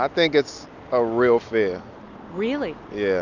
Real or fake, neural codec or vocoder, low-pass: real; none; 7.2 kHz